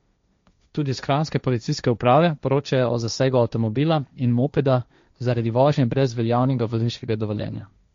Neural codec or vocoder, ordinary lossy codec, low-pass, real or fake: codec, 16 kHz, 1.1 kbps, Voila-Tokenizer; MP3, 48 kbps; 7.2 kHz; fake